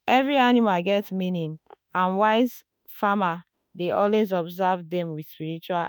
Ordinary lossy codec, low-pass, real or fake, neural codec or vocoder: none; none; fake; autoencoder, 48 kHz, 32 numbers a frame, DAC-VAE, trained on Japanese speech